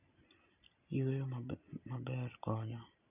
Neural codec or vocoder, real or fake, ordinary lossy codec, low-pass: none; real; none; 3.6 kHz